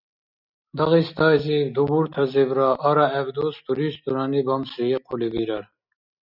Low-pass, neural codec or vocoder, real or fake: 5.4 kHz; none; real